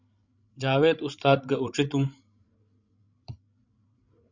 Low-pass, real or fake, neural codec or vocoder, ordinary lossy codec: 7.2 kHz; fake; codec, 16 kHz, 16 kbps, FreqCodec, larger model; Opus, 64 kbps